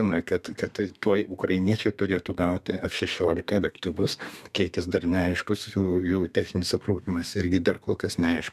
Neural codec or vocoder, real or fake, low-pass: codec, 32 kHz, 1.9 kbps, SNAC; fake; 14.4 kHz